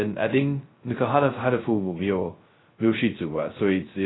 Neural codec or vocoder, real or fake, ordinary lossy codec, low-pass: codec, 16 kHz, 0.2 kbps, FocalCodec; fake; AAC, 16 kbps; 7.2 kHz